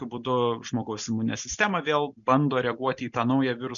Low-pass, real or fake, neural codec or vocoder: 7.2 kHz; real; none